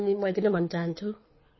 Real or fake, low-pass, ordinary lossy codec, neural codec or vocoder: fake; 7.2 kHz; MP3, 24 kbps; codec, 24 kHz, 6 kbps, HILCodec